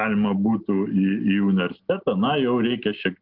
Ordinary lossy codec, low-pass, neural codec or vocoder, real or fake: Opus, 32 kbps; 5.4 kHz; none; real